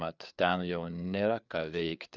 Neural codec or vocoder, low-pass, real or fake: codec, 16 kHz, 4 kbps, FreqCodec, larger model; 7.2 kHz; fake